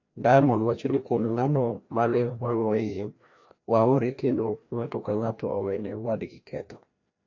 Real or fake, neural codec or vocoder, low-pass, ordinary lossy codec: fake; codec, 16 kHz, 1 kbps, FreqCodec, larger model; 7.2 kHz; AAC, 48 kbps